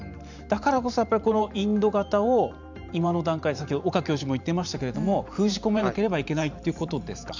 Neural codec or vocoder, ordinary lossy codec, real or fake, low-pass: vocoder, 44.1 kHz, 128 mel bands every 512 samples, BigVGAN v2; none; fake; 7.2 kHz